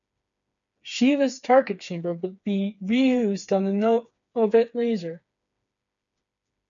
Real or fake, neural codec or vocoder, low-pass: fake; codec, 16 kHz, 4 kbps, FreqCodec, smaller model; 7.2 kHz